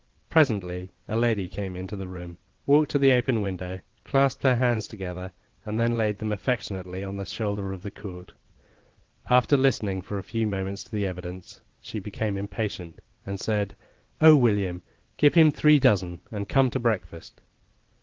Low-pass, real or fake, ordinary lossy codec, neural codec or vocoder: 7.2 kHz; fake; Opus, 16 kbps; vocoder, 22.05 kHz, 80 mel bands, WaveNeXt